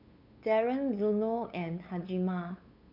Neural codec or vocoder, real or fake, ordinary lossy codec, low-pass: codec, 16 kHz, 8 kbps, FunCodec, trained on LibriTTS, 25 frames a second; fake; none; 5.4 kHz